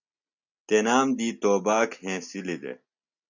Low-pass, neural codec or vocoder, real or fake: 7.2 kHz; none; real